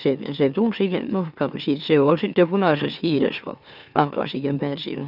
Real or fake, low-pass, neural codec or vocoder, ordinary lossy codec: fake; 5.4 kHz; autoencoder, 44.1 kHz, a latent of 192 numbers a frame, MeloTTS; none